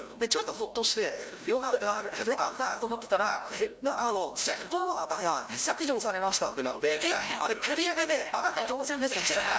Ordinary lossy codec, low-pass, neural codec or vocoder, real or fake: none; none; codec, 16 kHz, 0.5 kbps, FreqCodec, larger model; fake